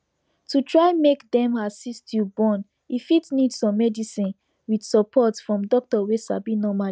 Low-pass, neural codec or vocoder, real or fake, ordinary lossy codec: none; none; real; none